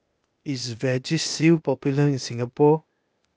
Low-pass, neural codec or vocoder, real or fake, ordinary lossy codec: none; codec, 16 kHz, 0.8 kbps, ZipCodec; fake; none